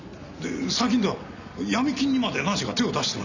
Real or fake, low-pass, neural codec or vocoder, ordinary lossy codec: real; 7.2 kHz; none; none